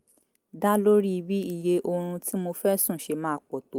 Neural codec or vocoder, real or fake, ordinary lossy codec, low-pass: none; real; Opus, 32 kbps; 19.8 kHz